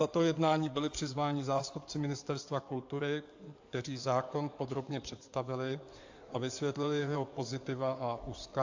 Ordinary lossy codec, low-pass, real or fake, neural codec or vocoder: AAC, 48 kbps; 7.2 kHz; fake; codec, 16 kHz in and 24 kHz out, 2.2 kbps, FireRedTTS-2 codec